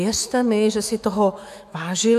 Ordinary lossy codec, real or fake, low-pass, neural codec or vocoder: AAC, 96 kbps; fake; 14.4 kHz; autoencoder, 48 kHz, 128 numbers a frame, DAC-VAE, trained on Japanese speech